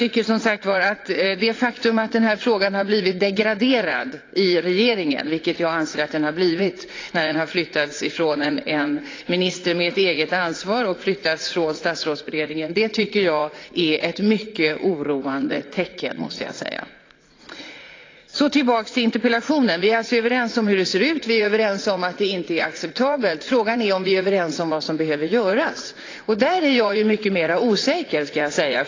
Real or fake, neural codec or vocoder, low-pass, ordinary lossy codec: fake; vocoder, 44.1 kHz, 80 mel bands, Vocos; 7.2 kHz; AAC, 32 kbps